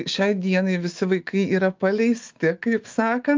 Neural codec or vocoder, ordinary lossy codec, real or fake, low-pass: autoencoder, 48 kHz, 128 numbers a frame, DAC-VAE, trained on Japanese speech; Opus, 24 kbps; fake; 7.2 kHz